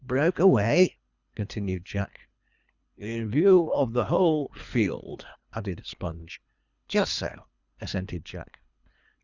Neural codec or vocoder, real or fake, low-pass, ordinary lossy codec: codec, 24 kHz, 3 kbps, HILCodec; fake; 7.2 kHz; Opus, 64 kbps